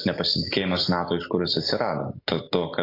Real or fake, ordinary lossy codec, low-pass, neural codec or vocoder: real; AAC, 32 kbps; 5.4 kHz; none